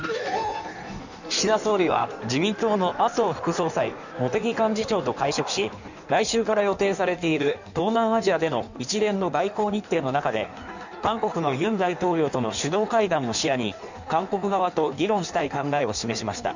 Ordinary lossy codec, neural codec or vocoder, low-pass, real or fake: none; codec, 16 kHz in and 24 kHz out, 1.1 kbps, FireRedTTS-2 codec; 7.2 kHz; fake